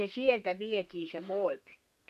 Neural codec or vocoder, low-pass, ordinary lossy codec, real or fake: codec, 32 kHz, 1.9 kbps, SNAC; 14.4 kHz; none; fake